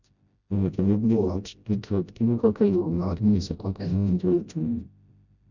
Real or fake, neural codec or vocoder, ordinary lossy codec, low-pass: fake; codec, 16 kHz, 0.5 kbps, FreqCodec, smaller model; none; 7.2 kHz